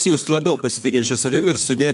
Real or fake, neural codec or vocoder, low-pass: fake; codec, 24 kHz, 1 kbps, SNAC; 10.8 kHz